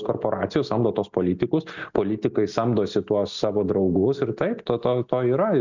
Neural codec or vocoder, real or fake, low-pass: none; real; 7.2 kHz